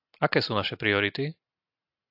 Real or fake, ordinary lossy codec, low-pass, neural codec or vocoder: real; AAC, 48 kbps; 5.4 kHz; none